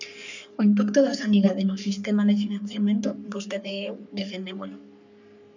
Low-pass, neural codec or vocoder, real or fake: 7.2 kHz; codec, 44.1 kHz, 3.4 kbps, Pupu-Codec; fake